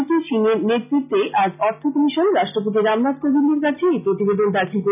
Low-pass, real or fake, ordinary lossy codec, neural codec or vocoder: 3.6 kHz; real; none; none